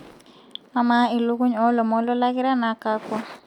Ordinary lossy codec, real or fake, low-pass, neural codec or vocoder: none; real; 19.8 kHz; none